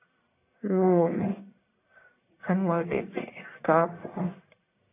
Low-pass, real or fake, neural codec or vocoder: 3.6 kHz; fake; codec, 44.1 kHz, 1.7 kbps, Pupu-Codec